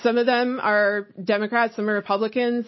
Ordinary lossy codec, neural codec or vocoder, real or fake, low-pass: MP3, 24 kbps; none; real; 7.2 kHz